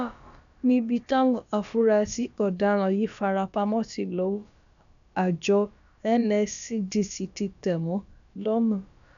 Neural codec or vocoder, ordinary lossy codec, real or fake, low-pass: codec, 16 kHz, about 1 kbps, DyCAST, with the encoder's durations; none; fake; 7.2 kHz